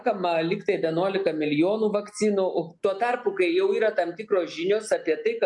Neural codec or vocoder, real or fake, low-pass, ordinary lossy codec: none; real; 10.8 kHz; MP3, 64 kbps